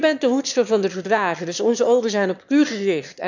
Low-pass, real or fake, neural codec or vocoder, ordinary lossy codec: 7.2 kHz; fake; autoencoder, 22.05 kHz, a latent of 192 numbers a frame, VITS, trained on one speaker; none